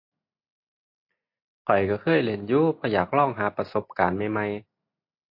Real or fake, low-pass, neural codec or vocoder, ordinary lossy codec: real; 5.4 kHz; none; MP3, 32 kbps